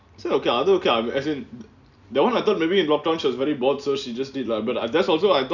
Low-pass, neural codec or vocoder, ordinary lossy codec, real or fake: 7.2 kHz; none; none; real